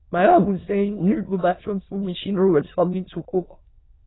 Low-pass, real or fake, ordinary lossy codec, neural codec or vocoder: 7.2 kHz; fake; AAC, 16 kbps; autoencoder, 22.05 kHz, a latent of 192 numbers a frame, VITS, trained on many speakers